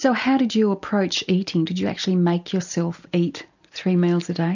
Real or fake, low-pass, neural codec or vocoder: real; 7.2 kHz; none